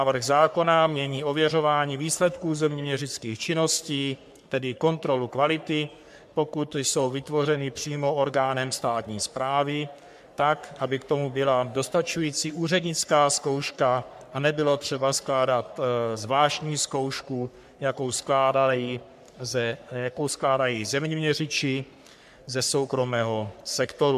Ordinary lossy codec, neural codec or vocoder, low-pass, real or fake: MP3, 96 kbps; codec, 44.1 kHz, 3.4 kbps, Pupu-Codec; 14.4 kHz; fake